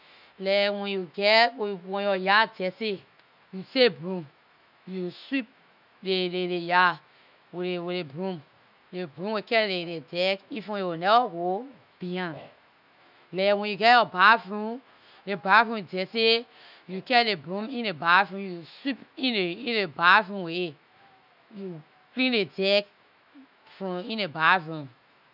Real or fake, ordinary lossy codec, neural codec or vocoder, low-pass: fake; none; autoencoder, 48 kHz, 128 numbers a frame, DAC-VAE, trained on Japanese speech; 5.4 kHz